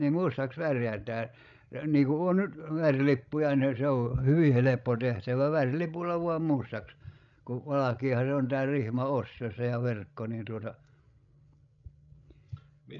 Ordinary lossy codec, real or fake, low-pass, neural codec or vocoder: none; fake; 7.2 kHz; codec, 16 kHz, 16 kbps, FreqCodec, larger model